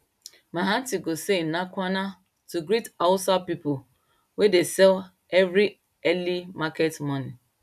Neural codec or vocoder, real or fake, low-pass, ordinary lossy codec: none; real; 14.4 kHz; none